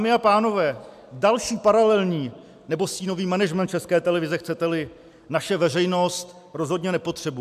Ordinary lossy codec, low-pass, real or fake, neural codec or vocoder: AAC, 96 kbps; 14.4 kHz; real; none